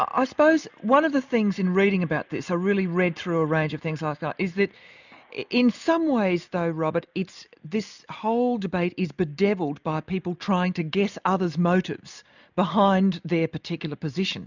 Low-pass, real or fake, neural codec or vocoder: 7.2 kHz; real; none